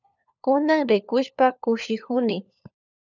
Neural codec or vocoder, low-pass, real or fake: codec, 16 kHz, 4 kbps, FunCodec, trained on LibriTTS, 50 frames a second; 7.2 kHz; fake